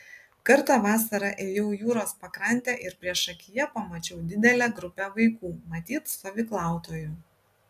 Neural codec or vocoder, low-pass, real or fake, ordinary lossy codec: none; 14.4 kHz; real; AAC, 96 kbps